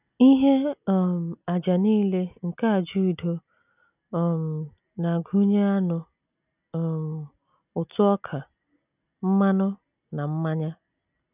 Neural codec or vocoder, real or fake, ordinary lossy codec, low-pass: none; real; none; 3.6 kHz